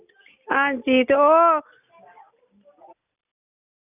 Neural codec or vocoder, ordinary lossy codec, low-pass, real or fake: none; none; 3.6 kHz; real